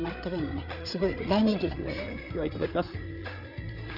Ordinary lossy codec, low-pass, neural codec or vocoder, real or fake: Opus, 64 kbps; 5.4 kHz; codec, 16 kHz, 8 kbps, FreqCodec, larger model; fake